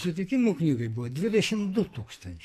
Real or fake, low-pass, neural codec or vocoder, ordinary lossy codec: fake; 14.4 kHz; codec, 44.1 kHz, 2.6 kbps, SNAC; MP3, 96 kbps